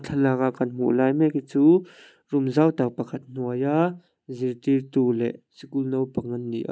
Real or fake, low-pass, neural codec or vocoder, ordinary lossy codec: real; none; none; none